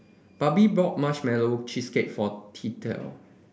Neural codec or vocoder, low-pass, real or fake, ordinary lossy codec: none; none; real; none